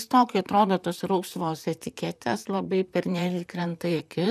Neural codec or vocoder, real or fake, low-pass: vocoder, 44.1 kHz, 128 mel bands, Pupu-Vocoder; fake; 14.4 kHz